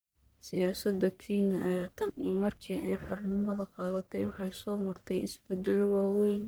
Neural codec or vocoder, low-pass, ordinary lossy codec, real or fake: codec, 44.1 kHz, 1.7 kbps, Pupu-Codec; none; none; fake